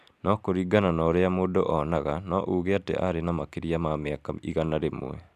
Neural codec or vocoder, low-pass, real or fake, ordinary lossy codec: none; 14.4 kHz; real; AAC, 96 kbps